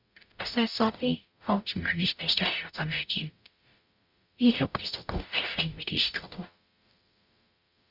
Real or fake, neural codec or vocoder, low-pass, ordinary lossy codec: fake; codec, 44.1 kHz, 0.9 kbps, DAC; 5.4 kHz; Opus, 64 kbps